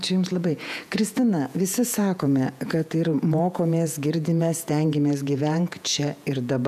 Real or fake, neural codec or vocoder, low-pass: fake; vocoder, 48 kHz, 128 mel bands, Vocos; 14.4 kHz